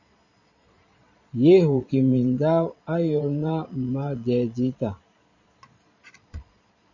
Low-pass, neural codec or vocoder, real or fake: 7.2 kHz; vocoder, 22.05 kHz, 80 mel bands, Vocos; fake